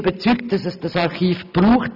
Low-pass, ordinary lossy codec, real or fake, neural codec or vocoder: 5.4 kHz; none; real; none